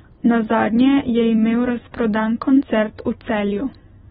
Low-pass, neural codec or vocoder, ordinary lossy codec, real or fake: 19.8 kHz; none; AAC, 16 kbps; real